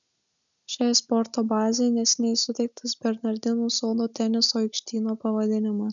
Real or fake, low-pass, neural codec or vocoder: real; 7.2 kHz; none